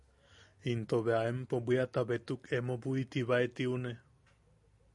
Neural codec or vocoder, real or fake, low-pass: none; real; 10.8 kHz